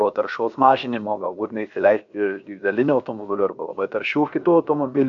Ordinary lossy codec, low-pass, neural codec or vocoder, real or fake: MP3, 96 kbps; 7.2 kHz; codec, 16 kHz, 0.7 kbps, FocalCodec; fake